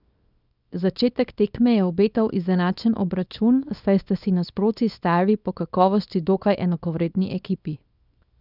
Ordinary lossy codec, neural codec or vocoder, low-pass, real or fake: none; codec, 24 kHz, 0.9 kbps, WavTokenizer, small release; 5.4 kHz; fake